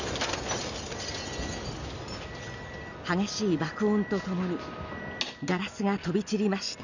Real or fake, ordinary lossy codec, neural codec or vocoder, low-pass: real; none; none; 7.2 kHz